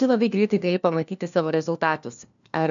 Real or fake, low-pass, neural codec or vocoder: fake; 7.2 kHz; codec, 16 kHz, 1 kbps, FunCodec, trained on LibriTTS, 50 frames a second